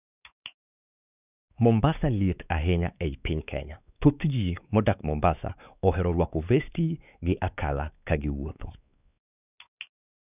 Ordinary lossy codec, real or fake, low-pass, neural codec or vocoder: none; fake; 3.6 kHz; codec, 16 kHz, 4 kbps, X-Codec, WavLM features, trained on Multilingual LibriSpeech